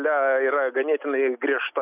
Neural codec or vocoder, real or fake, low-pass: none; real; 3.6 kHz